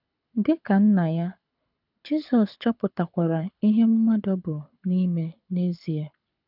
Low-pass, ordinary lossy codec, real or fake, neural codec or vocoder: 5.4 kHz; none; fake; codec, 24 kHz, 6 kbps, HILCodec